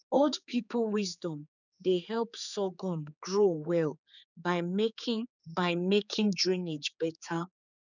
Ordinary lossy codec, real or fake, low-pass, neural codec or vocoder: none; fake; 7.2 kHz; codec, 16 kHz, 4 kbps, X-Codec, HuBERT features, trained on general audio